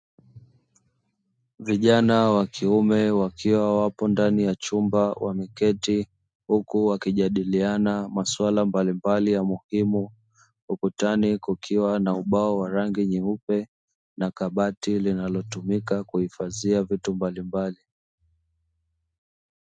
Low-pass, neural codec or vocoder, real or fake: 9.9 kHz; none; real